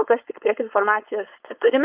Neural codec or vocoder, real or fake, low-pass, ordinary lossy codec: codec, 16 kHz, 4.8 kbps, FACodec; fake; 3.6 kHz; Opus, 32 kbps